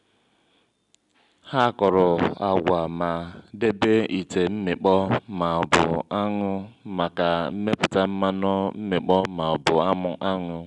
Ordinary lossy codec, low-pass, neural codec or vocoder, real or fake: none; 10.8 kHz; none; real